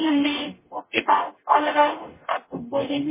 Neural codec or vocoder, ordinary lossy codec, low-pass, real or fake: codec, 44.1 kHz, 0.9 kbps, DAC; MP3, 16 kbps; 3.6 kHz; fake